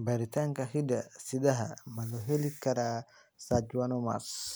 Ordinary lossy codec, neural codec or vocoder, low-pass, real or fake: none; none; none; real